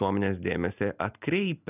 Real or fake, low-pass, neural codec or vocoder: real; 3.6 kHz; none